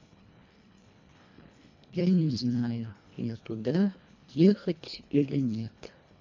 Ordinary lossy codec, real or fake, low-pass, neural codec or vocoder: MP3, 64 kbps; fake; 7.2 kHz; codec, 24 kHz, 1.5 kbps, HILCodec